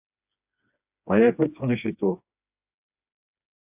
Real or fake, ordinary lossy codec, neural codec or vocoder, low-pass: fake; AAC, 32 kbps; codec, 16 kHz, 2 kbps, FreqCodec, smaller model; 3.6 kHz